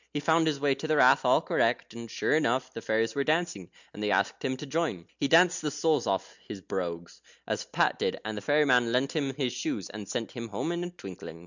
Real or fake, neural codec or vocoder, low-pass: real; none; 7.2 kHz